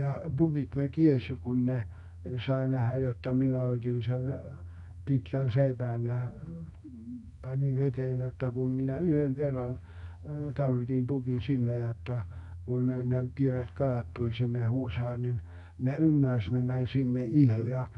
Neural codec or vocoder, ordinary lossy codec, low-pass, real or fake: codec, 24 kHz, 0.9 kbps, WavTokenizer, medium music audio release; none; 10.8 kHz; fake